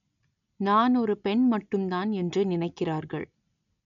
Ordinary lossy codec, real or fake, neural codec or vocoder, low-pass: none; real; none; 7.2 kHz